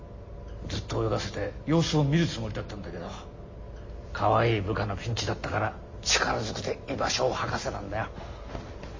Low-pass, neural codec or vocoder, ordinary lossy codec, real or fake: 7.2 kHz; none; MP3, 32 kbps; real